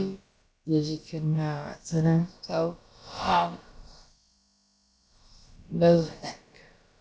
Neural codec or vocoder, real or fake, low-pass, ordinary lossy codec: codec, 16 kHz, about 1 kbps, DyCAST, with the encoder's durations; fake; none; none